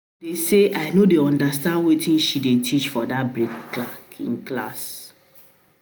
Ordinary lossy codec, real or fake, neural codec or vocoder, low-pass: none; real; none; none